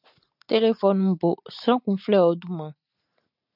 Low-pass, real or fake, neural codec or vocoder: 5.4 kHz; real; none